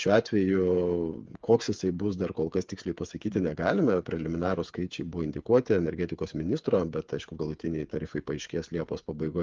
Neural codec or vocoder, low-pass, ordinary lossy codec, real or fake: codec, 16 kHz, 8 kbps, FreqCodec, smaller model; 7.2 kHz; Opus, 24 kbps; fake